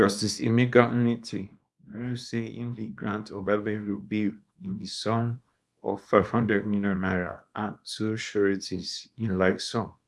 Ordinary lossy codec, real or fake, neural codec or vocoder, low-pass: none; fake; codec, 24 kHz, 0.9 kbps, WavTokenizer, small release; none